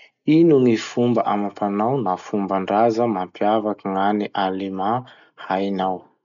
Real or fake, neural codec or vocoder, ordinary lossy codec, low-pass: real; none; none; 7.2 kHz